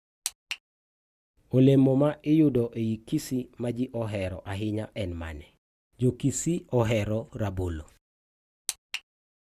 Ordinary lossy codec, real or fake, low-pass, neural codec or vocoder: Opus, 64 kbps; real; 14.4 kHz; none